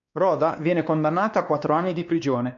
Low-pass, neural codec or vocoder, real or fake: 7.2 kHz; codec, 16 kHz, 2 kbps, X-Codec, WavLM features, trained on Multilingual LibriSpeech; fake